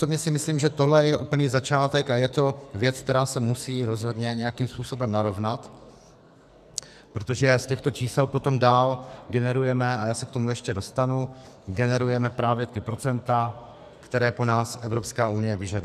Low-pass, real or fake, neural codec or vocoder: 14.4 kHz; fake; codec, 44.1 kHz, 2.6 kbps, SNAC